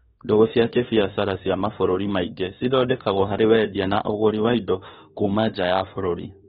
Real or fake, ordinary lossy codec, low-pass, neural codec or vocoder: fake; AAC, 16 kbps; 19.8 kHz; autoencoder, 48 kHz, 32 numbers a frame, DAC-VAE, trained on Japanese speech